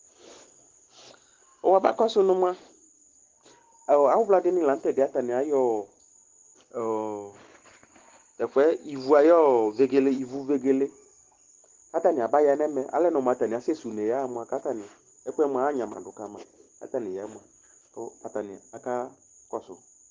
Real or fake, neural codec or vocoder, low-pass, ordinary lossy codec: real; none; 7.2 kHz; Opus, 16 kbps